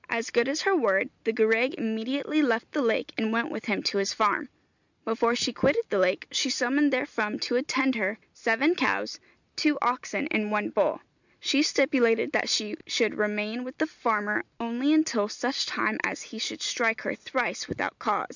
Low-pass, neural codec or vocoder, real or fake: 7.2 kHz; none; real